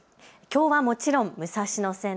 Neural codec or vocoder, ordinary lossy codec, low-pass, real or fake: none; none; none; real